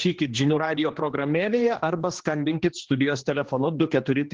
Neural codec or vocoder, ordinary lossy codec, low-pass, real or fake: codec, 16 kHz, 2 kbps, X-Codec, HuBERT features, trained on general audio; Opus, 16 kbps; 7.2 kHz; fake